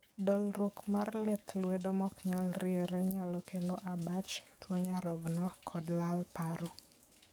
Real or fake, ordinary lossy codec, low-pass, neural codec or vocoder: fake; none; none; codec, 44.1 kHz, 7.8 kbps, DAC